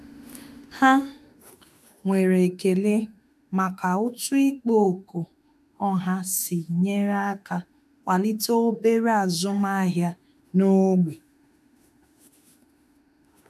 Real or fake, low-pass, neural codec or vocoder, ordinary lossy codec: fake; 14.4 kHz; autoencoder, 48 kHz, 32 numbers a frame, DAC-VAE, trained on Japanese speech; none